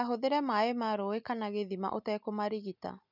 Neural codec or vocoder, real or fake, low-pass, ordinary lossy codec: none; real; 5.4 kHz; none